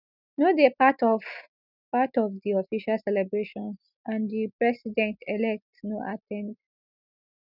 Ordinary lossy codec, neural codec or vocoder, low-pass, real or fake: none; none; 5.4 kHz; real